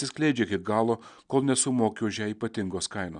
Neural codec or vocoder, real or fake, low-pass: none; real; 9.9 kHz